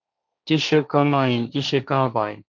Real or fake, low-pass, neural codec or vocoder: fake; 7.2 kHz; codec, 16 kHz, 1.1 kbps, Voila-Tokenizer